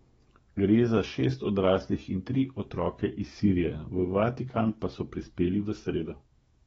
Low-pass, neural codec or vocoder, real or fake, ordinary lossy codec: 19.8 kHz; codec, 44.1 kHz, 7.8 kbps, DAC; fake; AAC, 24 kbps